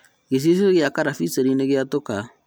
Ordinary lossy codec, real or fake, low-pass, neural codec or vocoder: none; real; none; none